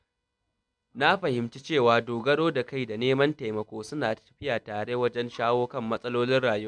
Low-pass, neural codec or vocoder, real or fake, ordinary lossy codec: 9.9 kHz; none; real; none